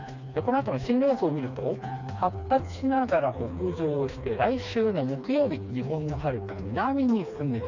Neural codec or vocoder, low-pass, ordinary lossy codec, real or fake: codec, 16 kHz, 2 kbps, FreqCodec, smaller model; 7.2 kHz; none; fake